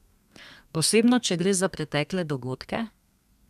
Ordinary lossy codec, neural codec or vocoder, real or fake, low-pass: none; codec, 32 kHz, 1.9 kbps, SNAC; fake; 14.4 kHz